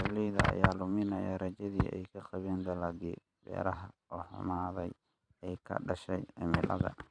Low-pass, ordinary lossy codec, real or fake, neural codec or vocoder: 9.9 kHz; none; fake; vocoder, 44.1 kHz, 128 mel bands every 512 samples, BigVGAN v2